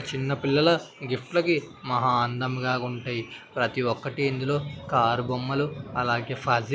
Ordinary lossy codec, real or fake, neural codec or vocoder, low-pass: none; real; none; none